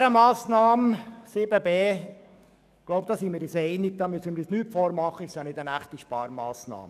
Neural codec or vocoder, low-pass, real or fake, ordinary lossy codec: codec, 44.1 kHz, 7.8 kbps, DAC; 14.4 kHz; fake; none